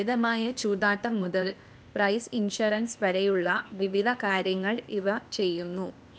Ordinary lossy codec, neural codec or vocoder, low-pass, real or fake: none; codec, 16 kHz, 0.8 kbps, ZipCodec; none; fake